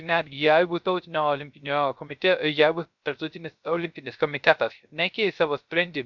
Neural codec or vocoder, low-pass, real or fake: codec, 16 kHz, 0.3 kbps, FocalCodec; 7.2 kHz; fake